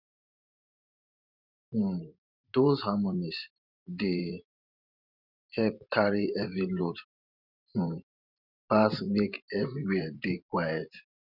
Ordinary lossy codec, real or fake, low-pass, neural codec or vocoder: none; fake; 5.4 kHz; vocoder, 44.1 kHz, 128 mel bands every 512 samples, BigVGAN v2